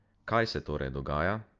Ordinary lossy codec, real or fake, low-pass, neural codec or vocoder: Opus, 24 kbps; real; 7.2 kHz; none